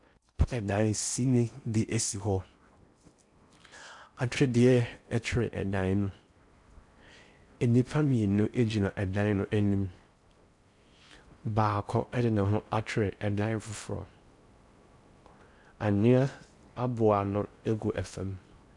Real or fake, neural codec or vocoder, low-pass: fake; codec, 16 kHz in and 24 kHz out, 0.6 kbps, FocalCodec, streaming, 4096 codes; 10.8 kHz